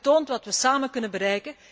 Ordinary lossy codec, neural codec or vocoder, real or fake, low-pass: none; none; real; none